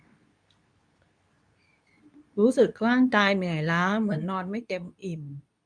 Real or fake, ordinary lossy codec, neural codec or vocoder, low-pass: fake; none; codec, 24 kHz, 0.9 kbps, WavTokenizer, medium speech release version 2; 9.9 kHz